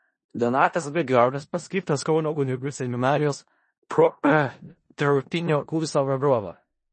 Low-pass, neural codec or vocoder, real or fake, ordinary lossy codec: 10.8 kHz; codec, 16 kHz in and 24 kHz out, 0.4 kbps, LongCat-Audio-Codec, four codebook decoder; fake; MP3, 32 kbps